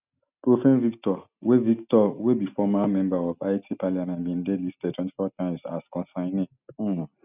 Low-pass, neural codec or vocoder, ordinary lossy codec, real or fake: 3.6 kHz; none; none; real